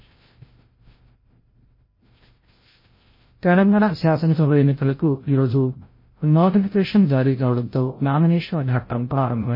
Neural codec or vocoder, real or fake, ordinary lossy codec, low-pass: codec, 16 kHz, 0.5 kbps, FreqCodec, larger model; fake; MP3, 24 kbps; 5.4 kHz